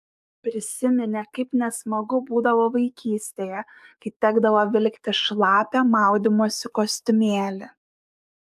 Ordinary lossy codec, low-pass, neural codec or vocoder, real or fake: AAC, 96 kbps; 14.4 kHz; codec, 44.1 kHz, 7.8 kbps, DAC; fake